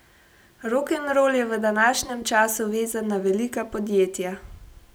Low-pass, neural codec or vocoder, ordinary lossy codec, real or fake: none; none; none; real